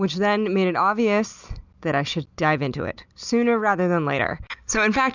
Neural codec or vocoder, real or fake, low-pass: none; real; 7.2 kHz